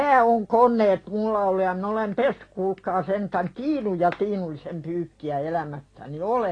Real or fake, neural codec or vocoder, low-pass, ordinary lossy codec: real; none; 9.9 kHz; AAC, 32 kbps